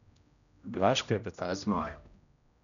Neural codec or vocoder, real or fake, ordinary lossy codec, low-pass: codec, 16 kHz, 0.5 kbps, X-Codec, HuBERT features, trained on general audio; fake; none; 7.2 kHz